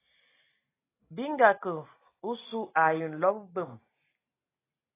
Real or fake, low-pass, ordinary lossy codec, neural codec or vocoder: real; 3.6 kHz; AAC, 16 kbps; none